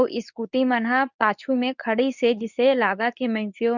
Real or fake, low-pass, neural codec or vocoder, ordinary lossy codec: fake; 7.2 kHz; codec, 24 kHz, 0.9 kbps, WavTokenizer, medium speech release version 2; none